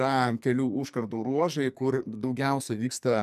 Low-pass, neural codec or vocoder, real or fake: 14.4 kHz; codec, 44.1 kHz, 2.6 kbps, SNAC; fake